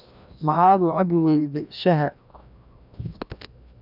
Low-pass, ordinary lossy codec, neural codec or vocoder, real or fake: 5.4 kHz; none; codec, 16 kHz, 1 kbps, FreqCodec, larger model; fake